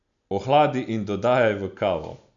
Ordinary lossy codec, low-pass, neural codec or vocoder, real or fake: none; 7.2 kHz; none; real